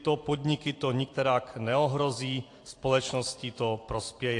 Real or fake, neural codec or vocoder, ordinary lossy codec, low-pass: fake; vocoder, 44.1 kHz, 128 mel bands every 256 samples, BigVGAN v2; AAC, 48 kbps; 10.8 kHz